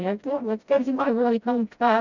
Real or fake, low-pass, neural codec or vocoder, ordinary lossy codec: fake; 7.2 kHz; codec, 16 kHz, 0.5 kbps, FreqCodec, smaller model; none